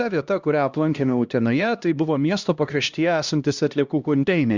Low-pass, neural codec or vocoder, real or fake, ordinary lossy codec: 7.2 kHz; codec, 16 kHz, 1 kbps, X-Codec, HuBERT features, trained on LibriSpeech; fake; Opus, 64 kbps